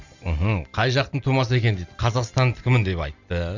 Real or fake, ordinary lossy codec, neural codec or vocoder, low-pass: real; none; none; 7.2 kHz